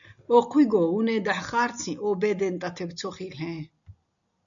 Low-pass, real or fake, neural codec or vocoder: 7.2 kHz; real; none